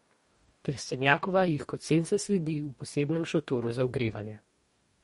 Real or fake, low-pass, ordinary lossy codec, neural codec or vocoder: fake; 19.8 kHz; MP3, 48 kbps; codec, 44.1 kHz, 2.6 kbps, DAC